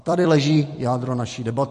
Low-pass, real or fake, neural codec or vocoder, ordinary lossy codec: 14.4 kHz; fake; vocoder, 44.1 kHz, 128 mel bands every 256 samples, BigVGAN v2; MP3, 48 kbps